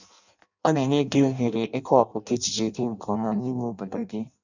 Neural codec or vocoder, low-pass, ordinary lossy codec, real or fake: codec, 16 kHz in and 24 kHz out, 0.6 kbps, FireRedTTS-2 codec; 7.2 kHz; none; fake